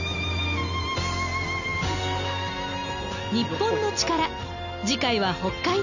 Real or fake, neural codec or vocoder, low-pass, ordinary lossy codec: real; none; 7.2 kHz; none